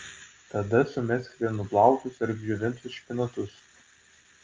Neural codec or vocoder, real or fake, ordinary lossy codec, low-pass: none; real; Opus, 32 kbps; 7.2 kHz